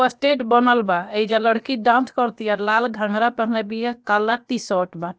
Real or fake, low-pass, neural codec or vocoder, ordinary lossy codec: fake; none; codec, 16 kHz, about 1 kbps, DyCAST, with the encoder's durations; none